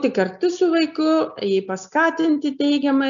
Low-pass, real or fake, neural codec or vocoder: 7.2 kHz; real; none